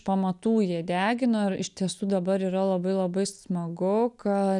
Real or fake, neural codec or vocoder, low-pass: real; none; 10.8 kHz